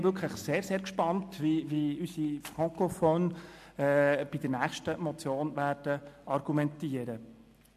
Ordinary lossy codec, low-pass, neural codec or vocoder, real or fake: MP3, 64 kbps; 14.4 kHz; none; real